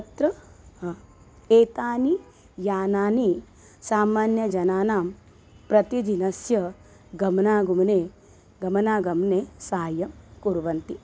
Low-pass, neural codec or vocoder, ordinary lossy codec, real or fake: none; none; none; real